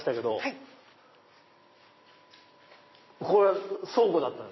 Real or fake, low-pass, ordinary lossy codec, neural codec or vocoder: fake; 7.2 kHz; MP3, 24 kbps; vocoder, 44.1 kHz, 128 mel bands every 256 samples, BigVGAN v2